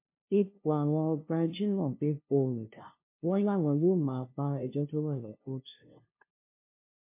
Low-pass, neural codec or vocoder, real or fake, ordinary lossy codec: 3.6 kHz; codec, 16 kHz, 0.5 kbps, FunCodec, trained on LibriTTS, 25 frames a second; fake; MP3, 24 kbps